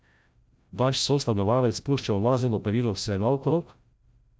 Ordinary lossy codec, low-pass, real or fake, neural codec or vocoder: none; none; fake; codec, 16 kHz, 0.5 kbps, FreqCodec, larger model